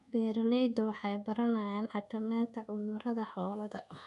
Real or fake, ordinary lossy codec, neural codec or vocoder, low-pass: fake; none; codec, 24 kHz, 1.2 kbps, DualCodec; 10.8 kHz